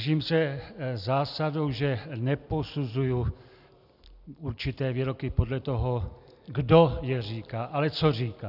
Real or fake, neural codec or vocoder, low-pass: real; none; 5.4 kHz